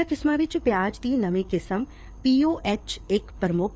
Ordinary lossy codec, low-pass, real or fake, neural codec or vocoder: none; none; fake; codec, 16 kHz, 4 kbps, FreqCodec, larger model